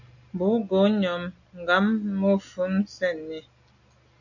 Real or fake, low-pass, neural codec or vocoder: real; 7.2 kHz; none